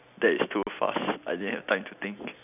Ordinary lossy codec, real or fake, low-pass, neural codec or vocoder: none; real; 3.6 kHz; none